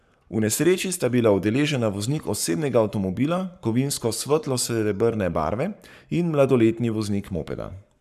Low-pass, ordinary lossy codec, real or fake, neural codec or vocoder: 14.4 kHz; none; fake; codec, 44.1 kHz, 7.8 kbps, Pupu-Codec